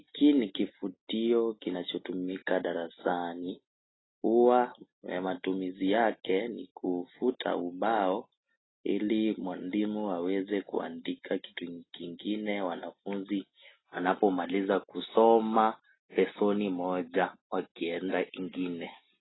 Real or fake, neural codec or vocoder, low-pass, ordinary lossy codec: real; none; 7.2 kHz; AAC, 16 kbps